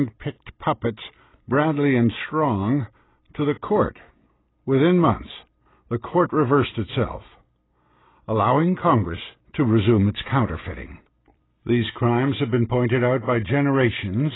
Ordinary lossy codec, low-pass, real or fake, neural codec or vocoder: AAC, 16 kbps; 7.2 kHz; real; none